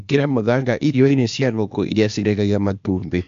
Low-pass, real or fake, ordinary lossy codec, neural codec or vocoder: 7.2 kHz; fake; MP3, 64 kbps; codec, 16 kHz, 0.8 kbps, ZipCodec